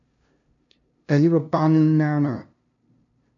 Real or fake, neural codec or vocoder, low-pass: fake; codec, 16 kHz, 0.5 kbps, FunCodec, trained on LibriTTS, 25 frames a second; 7.2 kHz